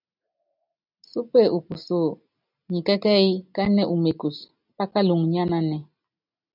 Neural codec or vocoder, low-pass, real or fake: none; 5.4 kHz; real